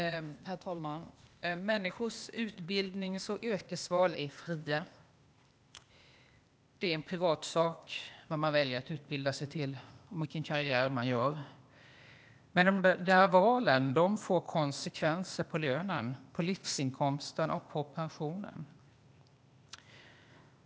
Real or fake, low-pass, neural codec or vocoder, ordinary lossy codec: fake; none; codec, 16 kHz, 0.8 kbps, ZipCodec; none